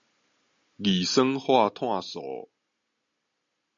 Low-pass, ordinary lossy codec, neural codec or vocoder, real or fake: 7.2 kHz; MP3, 64 kbps; none; real